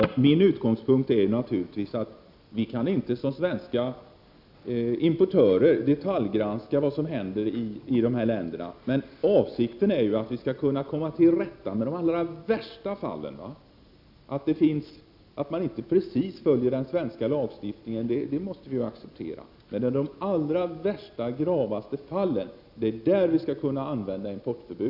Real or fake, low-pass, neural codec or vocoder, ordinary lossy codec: real; 5.4 kHz; none; none